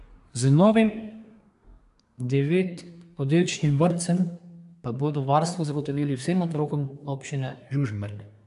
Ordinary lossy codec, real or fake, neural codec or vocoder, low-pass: AAC, 96 kbps; fake; codec, 24 kHz, 1 kbps, SNAC; 10.8 kHz